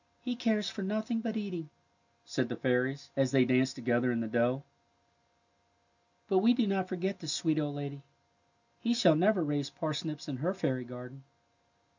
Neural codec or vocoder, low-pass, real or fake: none; 7.2 kHz; real